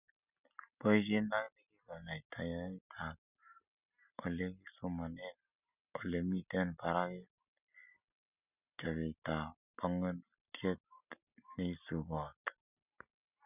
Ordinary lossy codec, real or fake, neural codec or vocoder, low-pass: none; real; none; 3.6 kHz